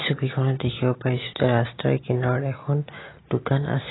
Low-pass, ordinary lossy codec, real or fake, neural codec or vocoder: 7.2 kHz; AAC, 16 kbps; real; none